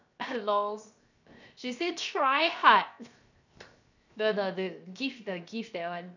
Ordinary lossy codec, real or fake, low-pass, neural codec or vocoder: none; fake; 7.2 kHz; codec, 16 kHz, 0.7 kbps, FocalCodec